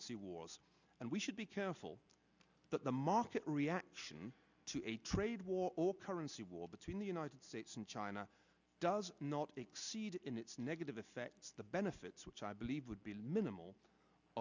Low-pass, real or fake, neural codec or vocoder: 7.2 kHz; real; none